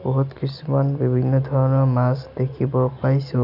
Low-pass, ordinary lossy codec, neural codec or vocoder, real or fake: 5.4 kHz; none; none; real